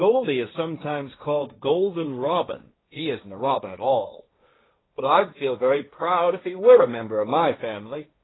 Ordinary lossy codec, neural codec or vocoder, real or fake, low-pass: AAC, 16 kbps; codec, 16 kHz, 1.1 kbps, Voila-Tokenizer; fake; 7.2 kHz